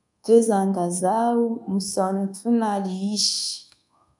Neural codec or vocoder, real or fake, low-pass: codec, 24 kHz, 1.2 kbps, DualCodec; fake; 10.8 kHz